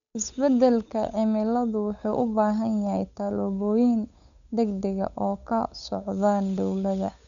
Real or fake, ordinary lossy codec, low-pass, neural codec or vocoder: fake; none; 7.2 kHz; codec, 16 kHz, 8 kbps, FunCodec, trained on Chinese and English, 25 frames a second